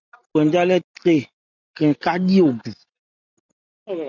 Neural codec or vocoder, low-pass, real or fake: none; 7.2 kHz; real